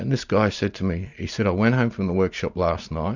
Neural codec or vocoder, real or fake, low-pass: none; real; 7.2 kHz